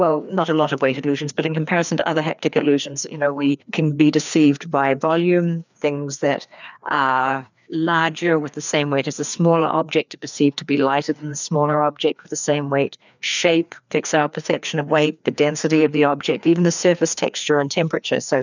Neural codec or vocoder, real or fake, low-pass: codec, 16 kHz, 2 kbps, FreqCodec, larger model; fake; 7.2 kHz